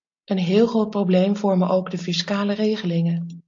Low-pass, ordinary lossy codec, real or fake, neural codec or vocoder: 7.2 kHz; AAC, 48 kbps; real; none